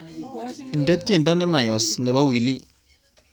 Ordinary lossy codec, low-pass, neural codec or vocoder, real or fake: none; none; codec, 44.1 kHz, 2.6 kbps, SNAC; fake